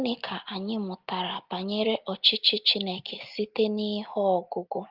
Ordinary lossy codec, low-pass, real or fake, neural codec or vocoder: Opus, 24 kbps; 5.4 kHz; real; none